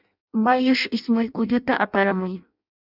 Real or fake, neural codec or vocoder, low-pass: fake; codec, 16 kHz in and 24 kHz out, 0.6 kbps, FireRedTTS-2 codec; 5.4 kHz